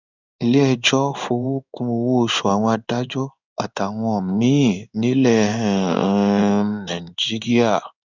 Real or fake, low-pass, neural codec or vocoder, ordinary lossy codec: fake; 7.2 kHz; codec, 16 kHz in and 24 kHz out, 1 kbps, XY-Tokenizer; none